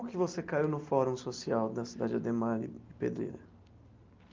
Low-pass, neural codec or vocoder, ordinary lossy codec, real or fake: 7.2 kHz; none; Opus, 32 kbps; real